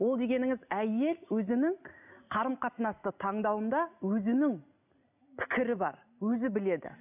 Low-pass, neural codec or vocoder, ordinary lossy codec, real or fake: 3.6 kHz; none; none; real